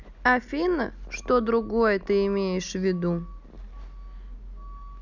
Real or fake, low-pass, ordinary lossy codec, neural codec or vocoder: real; 7.2 kHz; none; none